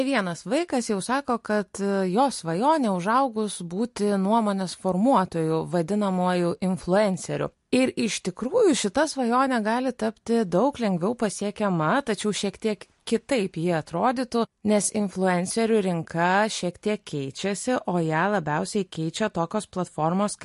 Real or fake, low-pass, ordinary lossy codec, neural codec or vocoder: real; 14.4 kHz; MP3, 48 kbps; none